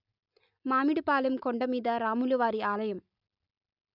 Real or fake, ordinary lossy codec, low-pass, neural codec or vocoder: real; none; 5.4 kHz; none